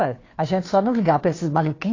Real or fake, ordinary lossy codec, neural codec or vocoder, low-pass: fake; AAC, 32 kbps; codec, 16 kHz, 2 kbps, FunCodec, trained on Chinese and English, 25 frames a second; 7.2 kHz